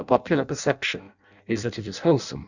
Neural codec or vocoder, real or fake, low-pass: codec, 16 kHz in and 24 kHz out, 0.6 kbps, FireRedTTS-2 codec; fake; 7.2 kHz